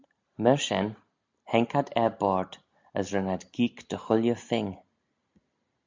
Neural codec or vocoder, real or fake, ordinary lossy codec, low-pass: none; real; MP3, 64 kbps; 7.2 kHz